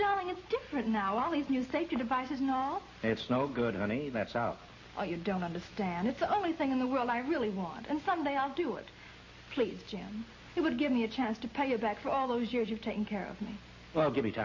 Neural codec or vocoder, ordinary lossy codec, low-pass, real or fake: none; MP3, 32 kbps; 7.2 kHz; real